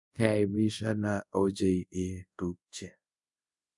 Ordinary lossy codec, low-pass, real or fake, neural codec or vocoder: none; 10.8 kHz; fake; codec, 24 kHz, 0.5 kbps, DualCodec